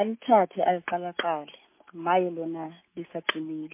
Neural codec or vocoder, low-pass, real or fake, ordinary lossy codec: none; 3.6 kHz; real; MP3, 24 kbps